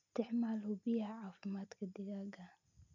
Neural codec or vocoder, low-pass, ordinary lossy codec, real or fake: none; 7.2 kHz; none; real